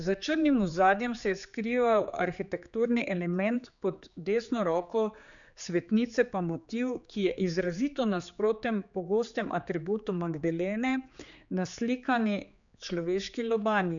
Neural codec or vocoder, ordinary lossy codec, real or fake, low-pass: codec, 16 kHz, 4 kbps, X-Codec, HuBERT features, trained on general audio; MP3, 96 kbps; fake; 7.2 kHz